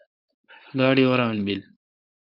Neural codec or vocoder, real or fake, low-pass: codec, 16 kHz, 4.8 kbps, FACodec; fake; 5.4 kHz